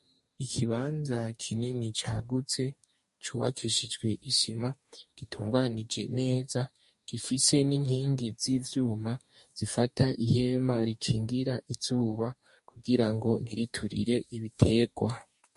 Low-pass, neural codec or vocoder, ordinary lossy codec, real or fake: 14.4 kHz; codec, 44.1 kHz, 2.6 kbps, DAC; MP3, 48 kbps; fake